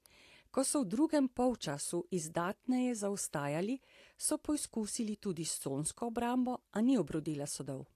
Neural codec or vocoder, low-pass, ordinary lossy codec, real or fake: none; 14.4 kHz; AAC, 64 kbps; real